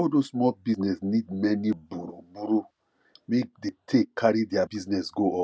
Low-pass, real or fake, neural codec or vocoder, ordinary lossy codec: none; real; none; none